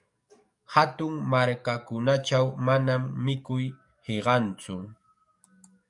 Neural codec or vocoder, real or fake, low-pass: codec, 44.1 kHz, 7.8 kbps, DAC; fake; 10.8 kHz